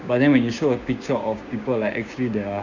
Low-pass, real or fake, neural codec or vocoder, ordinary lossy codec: 7.2 kHz; fake; codec, 16 kHz, 6 kbps, DAC; Opus, 64 kbps